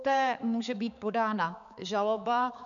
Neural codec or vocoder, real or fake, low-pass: codec, 16 kHz, 4 kbps, X-Codec, HuBERT features, trained on balanced general audio; fake; 7.2 kHz